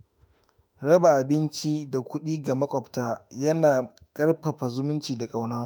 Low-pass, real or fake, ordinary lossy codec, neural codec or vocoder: none; fake; none; autoencoder, 48 kHz, 32 numbers a frame, DAC-VAE, trained on Japanese speech